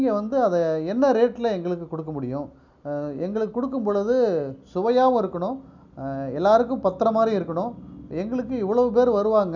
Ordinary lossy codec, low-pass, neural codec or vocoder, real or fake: none; 7.2 kHz; none; real